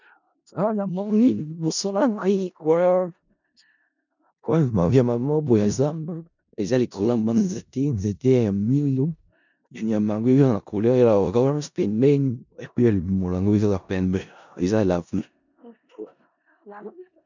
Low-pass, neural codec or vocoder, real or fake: 7.2 kHz; codec, 16 kHz in and 24 kHz out, 0.4 kbps, LongCat-Audio-Codec, four codebook decoder; fake